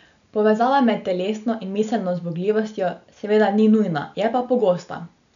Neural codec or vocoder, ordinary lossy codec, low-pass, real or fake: none; none; 7.2 kHz; real